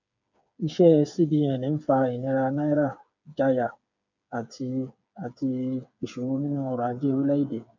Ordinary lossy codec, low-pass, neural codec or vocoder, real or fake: none; 7.2 kHz; codec, 16 kHz, 8 kbps, FreqCodec, smaller model; fake